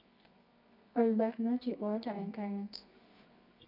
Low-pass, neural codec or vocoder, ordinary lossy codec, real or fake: 5.4 kHz; codec, 24 kHz, 0.9 kbps, WavTokenizer, medium music audio release; none; fake